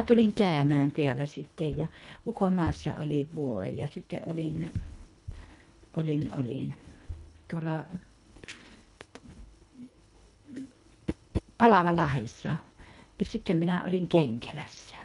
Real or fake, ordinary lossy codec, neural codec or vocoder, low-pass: fake; none; codec, 24 kHz, 1.5 kbps, HILCodec; 10.8 kHz